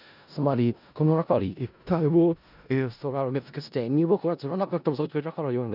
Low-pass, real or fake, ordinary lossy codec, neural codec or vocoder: 5.4 kHz; fake; none; codec, 16 kHz in and 24 kHz out, 0.4 kbps, LongCat-Audio-Codec, four codebook decoder